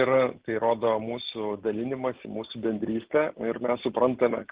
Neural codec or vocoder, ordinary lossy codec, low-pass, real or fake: none; Opus, 16 kbps; 3.6 kHz; real